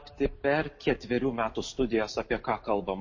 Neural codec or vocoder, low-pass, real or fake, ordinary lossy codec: none; 7.2 kHz; real; MP3, 32 kbps